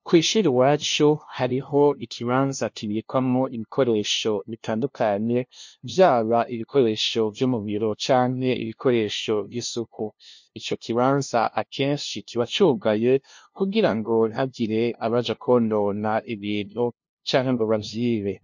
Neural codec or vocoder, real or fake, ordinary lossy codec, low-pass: codec, 16 kHz, 0.5 kbps, FunCodec, trained on LibriTTS, 25 frames a second; fake; MP3, 48 kbps; 7.2 kHz